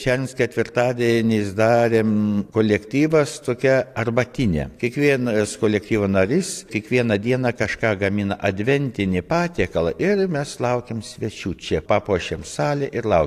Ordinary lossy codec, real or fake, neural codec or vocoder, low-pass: AAC, 64 kbps; fake; vocoder, 44.1 kHz, 128 mel bands every 512 samples, BigVGAN v2; 14.4 kHz